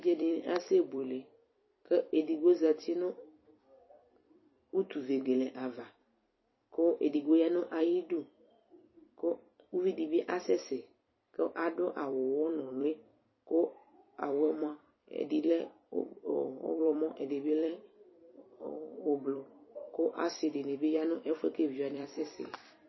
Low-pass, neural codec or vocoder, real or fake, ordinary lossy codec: 7.2 kHz; vocoder, 44.1 kHz, 128 mel bands every 256 samples, BigVGAN v2; fake; MP3, 24 kbps